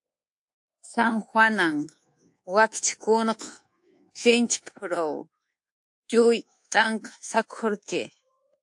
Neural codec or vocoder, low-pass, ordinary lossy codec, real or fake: codec, 24 kHz, 1.2 kbps, DualCodec; 10.8 kHz; AAC, 64 kbps; fake